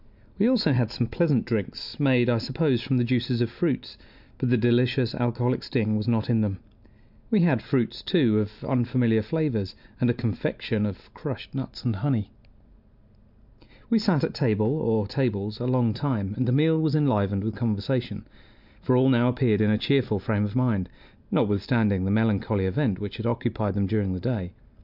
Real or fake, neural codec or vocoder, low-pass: real; none; 5.4 kHz